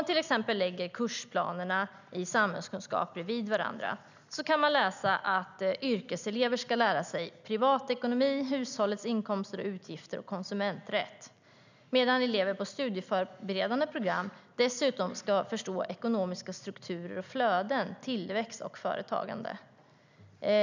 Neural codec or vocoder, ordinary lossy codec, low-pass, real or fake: none; none; 7.2 kHz; real